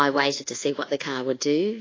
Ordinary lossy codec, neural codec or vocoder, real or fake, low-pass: AAC, 32 kbps; codec, 24 kHz, 1.2 kbps, DualCodec; fake; 7.2 kHz